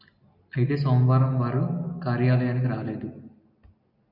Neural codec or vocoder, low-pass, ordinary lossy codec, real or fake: none; 5.4 kHz; MP3, 48 kbps; real